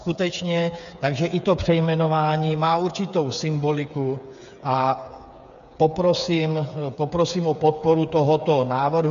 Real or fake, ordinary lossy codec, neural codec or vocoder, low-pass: fake; AAC, 96 kbps; codec, 16 kHz, 8 kbps, FreqCodec, smaller model; 7.2 kHz